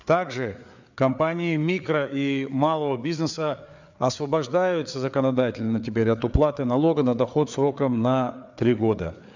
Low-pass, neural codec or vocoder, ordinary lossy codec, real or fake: 7.2 kHz; codec, 16 kHz, 4 kbps, FreqCodec, larger model; none; fake